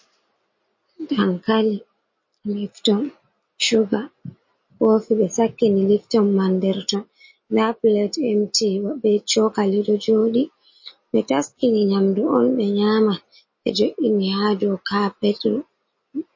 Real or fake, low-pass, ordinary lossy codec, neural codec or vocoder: real; 7.2 kHz; MP3, 32 kbps; none